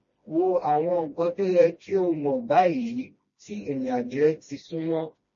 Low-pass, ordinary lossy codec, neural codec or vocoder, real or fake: 7.2 kHz; MP3, 32 kbps; codec, 16 kHz, 1 kbps, FreqCodec, smaller model; fake